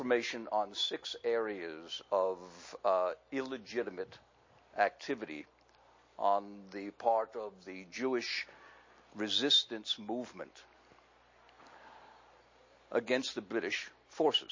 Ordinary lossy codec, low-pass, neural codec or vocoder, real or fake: MP3, 32 kbps; 7.2 kHz; none; real